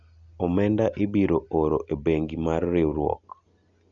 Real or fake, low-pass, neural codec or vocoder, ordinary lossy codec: real; 7.2 kHz; none; none